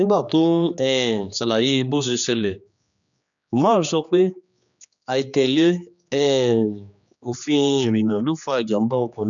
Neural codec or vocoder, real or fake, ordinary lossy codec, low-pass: codec, 16 kHz, 2 kbps, X-Codec, HuBERT features, trained on general audio; fake; none; 7.2 kHz